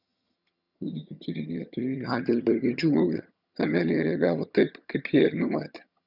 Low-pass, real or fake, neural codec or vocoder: 5.4 kHz; fake; vocoder, 22.05 kHz, 80 mel bands, HiFi-GAN